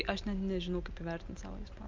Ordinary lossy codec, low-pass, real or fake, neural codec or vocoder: Opus, 32 kbps; 7.2 kHz; real; none